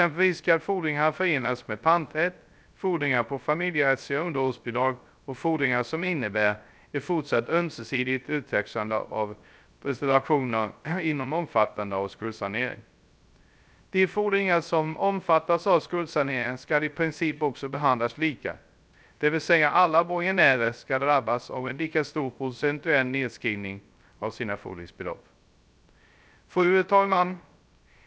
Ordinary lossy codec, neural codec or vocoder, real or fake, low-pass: none; codec, 16 kHz, 0.3 kbps, FocalCodec; fake; none